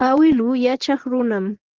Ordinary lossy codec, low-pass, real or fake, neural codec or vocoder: Opus, 16 kbps; 7.2 kHz; fake; vocoder, 24 kHz, 100 mel bands, Vocos